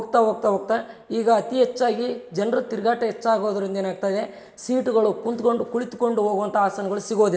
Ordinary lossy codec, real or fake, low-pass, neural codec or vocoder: none; real; none; none